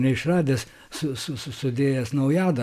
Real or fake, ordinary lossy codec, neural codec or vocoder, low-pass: real; AAC, 96 kbps; none; 14.4 kHz